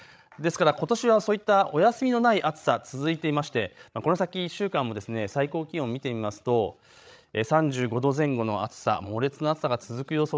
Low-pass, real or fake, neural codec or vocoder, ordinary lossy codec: none; fake; codec, 16 kHz, 16 kbps, FreqCodec, larger model; none